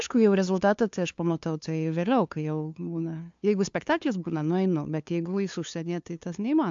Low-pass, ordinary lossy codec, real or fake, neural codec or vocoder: 7.2 kHz; AAC, 64 kbps; fake; codec, 16 kHz, 2 kbps, FunCodec, trained on LibriTTS, 25 frames a second